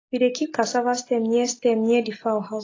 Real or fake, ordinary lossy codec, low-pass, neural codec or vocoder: real; AAC, 32 kbps; 7.2 kHz; none